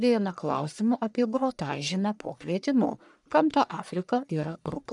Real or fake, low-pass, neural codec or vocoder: fake; 10.8 kHz; codec, 44.1 kHz, 1.7 kbps, Pupu-Codec